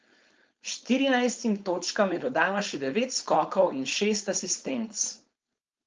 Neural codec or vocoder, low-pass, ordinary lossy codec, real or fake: codec, 16 kHz, 4.8 kbps, FACodec; 7.2 kHz; Opus, 24 kbps; fake